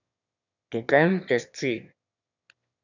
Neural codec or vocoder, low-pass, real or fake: autoencoder, 22.05 kHz, a latent of 192 numbers a frame, VITS, trained on one speaker; 7.2 kHz; fake